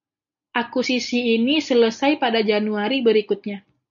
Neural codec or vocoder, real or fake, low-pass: none; real; 7.2 kHz